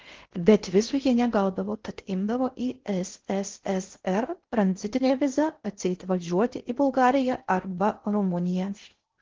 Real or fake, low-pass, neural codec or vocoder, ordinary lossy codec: fake; 7.2 kHz; codec, 16 kHz in and 24 kHz out, 0.6 kbps, FocalCodec, streaming, 4096 codes; Opus, 16 kbps